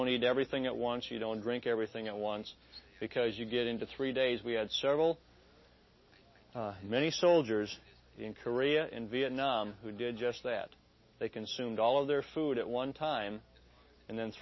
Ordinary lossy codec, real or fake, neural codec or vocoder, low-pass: MP3, 24 kbps; real; none; 7.2 kHz